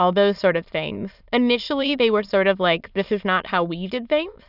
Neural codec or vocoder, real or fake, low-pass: autoencoder, 22.05 kHz, a latent of 192 numbers a frame, VITS, trained on many speakers; fake; 5.4 kHz